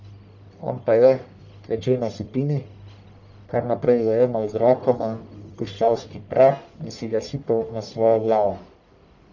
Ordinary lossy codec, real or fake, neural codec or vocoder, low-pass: none; fake; codec, 44.1 kHz, 1.7 kbps, Pupu-Codec; 7.2 kHz